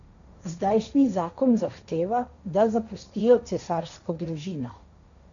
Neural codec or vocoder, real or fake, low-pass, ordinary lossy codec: codec, 16 kHz, 1.1 kbps, Voila-Tokenizer; fake; 7.2 kHz; none